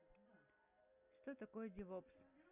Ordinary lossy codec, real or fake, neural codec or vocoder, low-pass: Opus, 64 kbps; real; none; 3.6 kHz